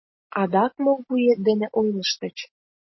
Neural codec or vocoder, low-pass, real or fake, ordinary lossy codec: none; 7.2 kHz; real; MP3, 24 kbps